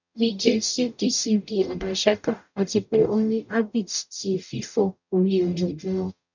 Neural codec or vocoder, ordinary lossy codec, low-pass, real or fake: codec, 44.1 kHz, 0.9 kbps, DAC; none; 7.2 kHz; fake